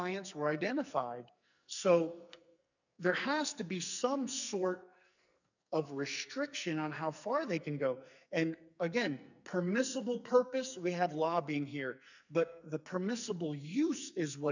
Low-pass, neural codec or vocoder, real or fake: 7.2 kHz; codec, 44.1 kHz, 2.6 kbps, SNAC; fake